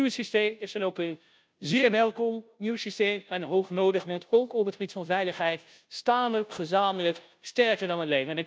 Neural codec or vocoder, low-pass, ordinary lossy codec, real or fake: codec, 16 kHz, 0.5 kbps, FunCodec, trained on Chinese and English, 25 frames a second; none; none; fake